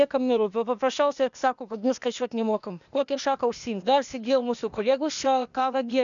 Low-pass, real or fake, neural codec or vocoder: 7.2 kHz; fake; codec, 16 kHz, 0.8 kbps, ZipCodec